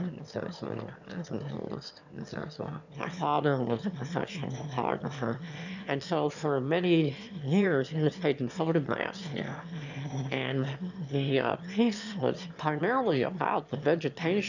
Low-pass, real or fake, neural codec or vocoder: 7.2 kHz; fake; autoencoder, 22.05 kHz, a latent of 192 numbers a frame, VITS, trained on one speaker